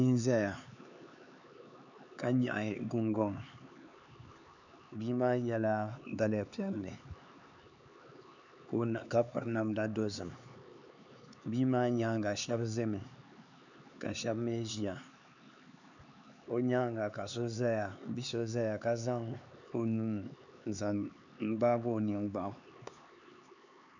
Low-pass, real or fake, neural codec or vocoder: 7.2 kHz; fake; codec, 16 kHz, 4 kbps, X-Codec, HuBERT features, trained on LibriSpeech